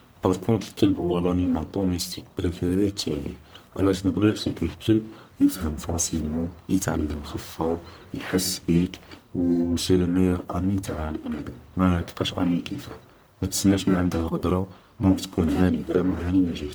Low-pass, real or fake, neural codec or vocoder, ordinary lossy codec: none; fake; codec, 44.1 kHz, 1.7 kbps, Pupu-Codec; none